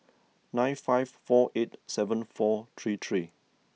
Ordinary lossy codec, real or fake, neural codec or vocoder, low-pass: none; real; none; none